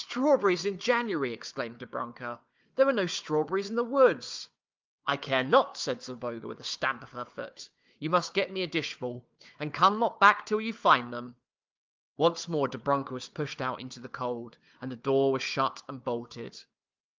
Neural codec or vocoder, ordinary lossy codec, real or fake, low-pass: codec, 16 kHz, 4 kbps, FunCodec, trained on Chinese and English, 50 frames a second; Opus, 24 kbps; fake; 7.2 kHz